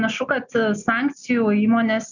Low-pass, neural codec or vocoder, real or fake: 7.2 kHz; none; real